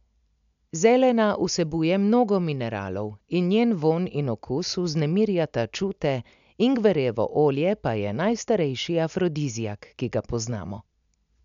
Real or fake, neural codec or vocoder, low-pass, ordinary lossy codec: real; none; 7.2 kHz; none